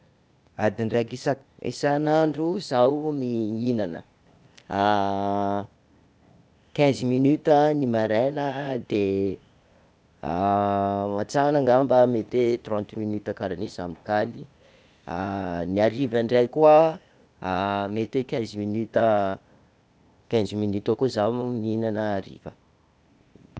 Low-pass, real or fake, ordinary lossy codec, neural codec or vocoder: none; fake; none; codec, 16 kHz, 0.8 kbps, ZipCodec